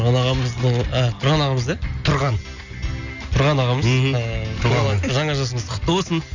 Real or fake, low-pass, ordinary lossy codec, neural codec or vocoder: real; 7.2 kHz; none; none